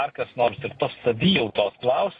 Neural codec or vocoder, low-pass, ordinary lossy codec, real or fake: none; 9.9 kHz; AAC, 32 kbps; real